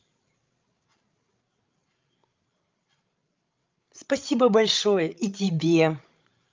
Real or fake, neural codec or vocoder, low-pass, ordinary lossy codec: fake; codec, 16 kHz, 16 kbps, FreqCodec, larger model; 7.2 kHz; Opus, 32 kbps